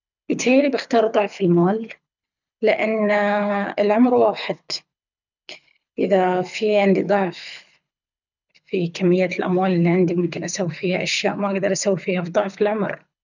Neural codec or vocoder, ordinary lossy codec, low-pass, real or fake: codec, 24 kHz, 6 kbps, HILCodec; none; 7.2 kHz; fake